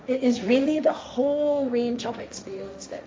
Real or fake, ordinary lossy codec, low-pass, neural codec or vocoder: fake; none; none; codec, 16 kHz, 1.1 kbps, Voila-Tokenizer